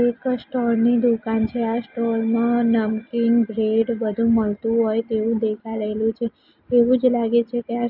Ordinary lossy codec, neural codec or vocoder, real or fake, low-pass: none; none; real; 5.4 kHz